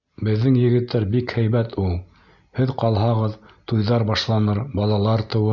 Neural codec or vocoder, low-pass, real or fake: none; 7.2 kHz; real